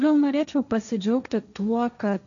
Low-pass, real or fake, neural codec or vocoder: 7.2 kHz; fake; codec, 16 kHz, 1.1 kbps, Voila-Tokenizer